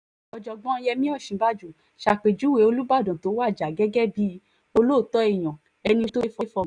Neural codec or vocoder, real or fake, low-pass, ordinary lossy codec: none; real; 9.9 kHz; none